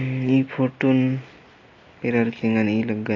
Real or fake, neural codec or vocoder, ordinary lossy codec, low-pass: real; none; AAC, 32 kbps; 7.2 kHz